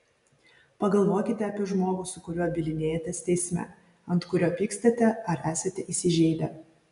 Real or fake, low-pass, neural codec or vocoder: real; 10.8 kHz; none